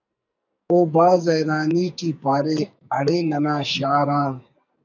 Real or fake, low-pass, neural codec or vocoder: fake; 7.2 kHz; codec, 44.1 kHz, 2.6 kbps, SNAC